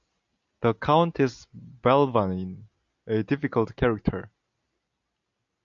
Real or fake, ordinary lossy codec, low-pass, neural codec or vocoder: real; MP3, 96 kbps; 7.2 kHz; none